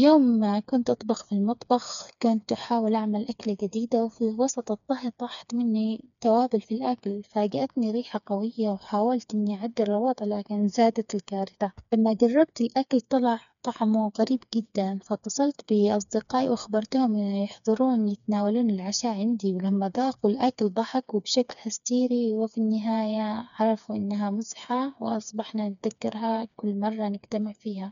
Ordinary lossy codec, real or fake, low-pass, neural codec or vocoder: none; fake; 7.2 kHz; codec, 16 kHz, 4 kbps, FreqCodec, smaller model